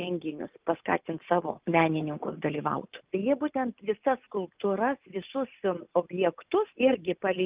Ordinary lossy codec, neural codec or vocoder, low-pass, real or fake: Opus, 24 kbps; none; 3.6 kHz; real